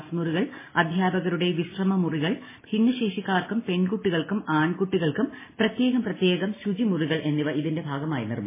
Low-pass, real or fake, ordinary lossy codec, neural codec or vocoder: 3.6 kHz; real; MP3, 16 kbps; none